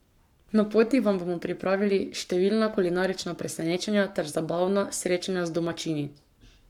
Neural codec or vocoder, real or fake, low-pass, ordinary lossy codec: codec, 44.1 kHz, 7.8 kbps, Pupu-Codec; fake; 19.8 kHz; none